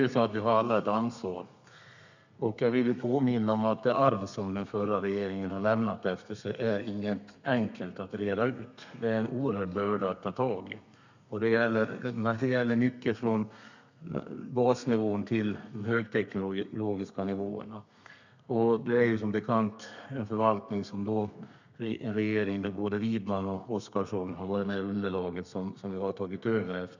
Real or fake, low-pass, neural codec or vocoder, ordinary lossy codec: fake; 7.2 kHz; codec, 32 kHz, 1.9 kbps, SNAC; none